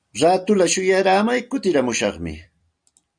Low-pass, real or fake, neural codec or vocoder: 9.9 kHz; fake; vocoder, 44.1 kHz, 128 mel bands every 256 samples, BigVGAN v2